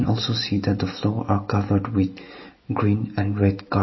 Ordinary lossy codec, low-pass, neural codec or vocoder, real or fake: MP3, 24 kbps; 7.2 kHz; none; real